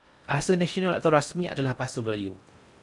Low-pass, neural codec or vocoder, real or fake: 10.8 kHz; codec, 16 kHz in and 24 kHz out, 0.6 kbps, FocalCodec, streaming, 4096 codes; fake